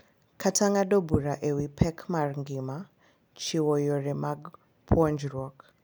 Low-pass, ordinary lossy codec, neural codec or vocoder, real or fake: none; none; none; real